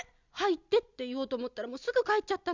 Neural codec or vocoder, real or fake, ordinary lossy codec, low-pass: none; real; Opus, 64 kbps; 7.2 kHz